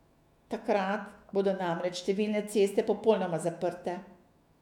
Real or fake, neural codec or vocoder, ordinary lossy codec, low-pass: fake; autoencoder, 48 kHz, 128 numbers a frame, DAC-VAE, trained on Japanese speech; none; 19.8 kHz